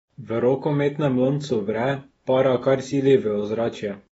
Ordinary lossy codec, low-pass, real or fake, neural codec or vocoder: AAC, 24 kbps; 19.8 kHz; fake; autoencoder, 48 kHz, 128 numbers a frame, DAC-VAE, trained on Japanese speech